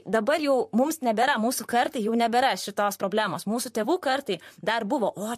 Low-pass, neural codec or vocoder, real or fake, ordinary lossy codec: 14.4 kHz; vocoder, 44.1 kHz, 128 mel bands, Pupu-Vocoder; fake; MP3, 64 kbps